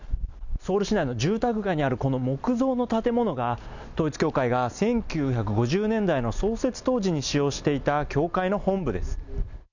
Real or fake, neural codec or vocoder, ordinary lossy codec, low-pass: real; none; none; 7.2 kHz